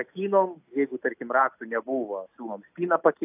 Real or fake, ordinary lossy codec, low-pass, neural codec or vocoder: real; AAC, 32 kbps; 3.6 kHz; none